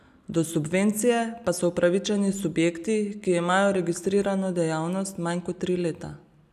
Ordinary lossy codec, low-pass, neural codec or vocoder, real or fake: none; 14.4 kHz; none; real